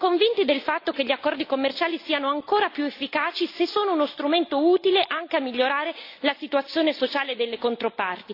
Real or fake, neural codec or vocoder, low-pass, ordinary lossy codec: real; none; 5.4 kHz; AAC, 32 kbps